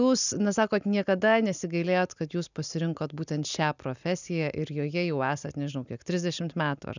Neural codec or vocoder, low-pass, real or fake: none; 7.2 kHz; real